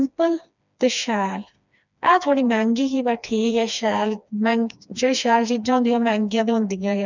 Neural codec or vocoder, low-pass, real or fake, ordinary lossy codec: codec, 16 kHz, 2 kbps, FreqCodec, smaller model; 7.2 kHz; fake; none